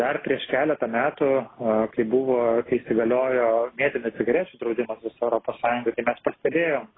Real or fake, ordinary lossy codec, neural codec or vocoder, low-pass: real; AAC, 16 kbps; none; 7.2 kHz